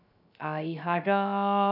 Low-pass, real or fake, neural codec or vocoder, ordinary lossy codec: 5.4 kHz; fake; codec, 16 kHz, 0.3 kbps, FocalCodec; none